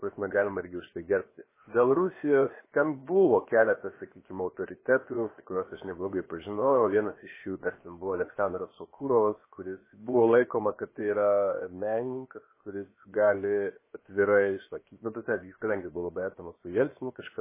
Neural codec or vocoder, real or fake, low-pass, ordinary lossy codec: codec, 16 kHz, 0.7 kbps, FocalCodec; fake; 3.6 kHz; MP3, 16 kbps